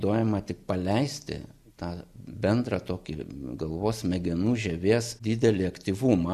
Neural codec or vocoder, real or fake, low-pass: none; real; 14.4 kHz